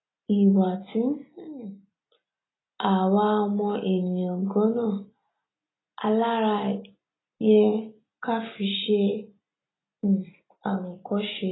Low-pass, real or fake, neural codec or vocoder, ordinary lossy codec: 7.2 kHz; real; none; AAC, 16 kbps